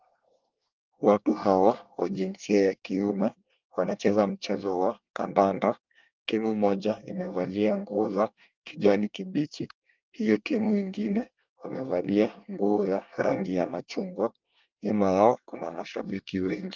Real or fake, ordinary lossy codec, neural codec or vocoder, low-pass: fake; Opus, 24 kbps; codec, 24 kHz, 1 kbps, SNAC; 7.2 kHz